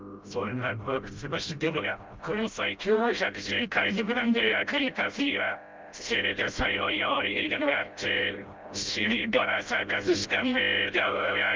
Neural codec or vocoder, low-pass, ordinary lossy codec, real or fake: codec, 16 kHz, 0.5 kbps, FreqCodec, smaller model; 7.2 kHz; Opus, 24 kbps; fake